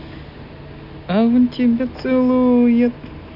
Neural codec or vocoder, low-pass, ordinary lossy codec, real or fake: none; 5.4 kHz; none; real